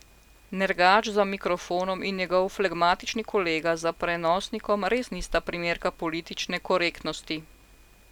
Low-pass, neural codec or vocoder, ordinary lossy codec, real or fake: 19.8 kHz; none; none; real